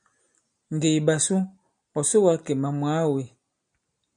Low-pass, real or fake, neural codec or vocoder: 9.9 kHz; real; none